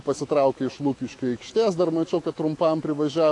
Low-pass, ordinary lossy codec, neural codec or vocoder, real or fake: 10.8 kHz; AAC, 48 kbps; autoencoder, 48 kHz, 128 numbers a frame, DAC-VAE, trained on Japanese speech; fake